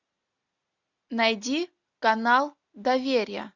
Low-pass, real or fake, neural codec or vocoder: 7.2 kHz; real; none